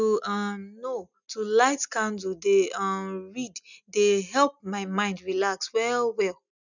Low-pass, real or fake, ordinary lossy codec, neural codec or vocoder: 7.2 kHz; real; none; none